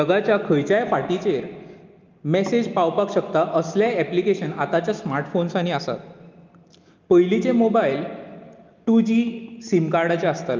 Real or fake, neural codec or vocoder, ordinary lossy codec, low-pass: real; none; Opus, 24 kbps; 7.2 kHz